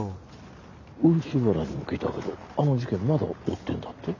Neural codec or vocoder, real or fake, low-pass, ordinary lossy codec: none; real; 7.2 kHz; none